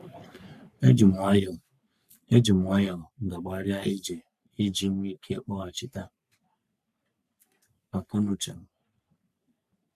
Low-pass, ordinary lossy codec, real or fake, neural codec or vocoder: 14.4 kHz; none; fake; codec, 44.1 kHz, 3.4 kbps, Pupu-Codec